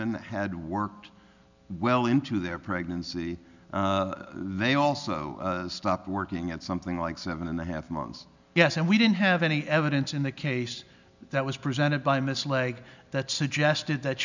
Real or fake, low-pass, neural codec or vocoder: real; 7.2 kHz; none